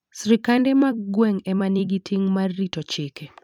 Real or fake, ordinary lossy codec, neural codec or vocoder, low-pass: fake; none; vocoder, 44.1 kHz, 128 mel bands every 256 samples, BigVGAN v2; 19.8 kHz